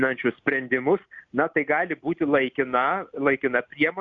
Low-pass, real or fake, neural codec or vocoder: 7.2 kHz; real; none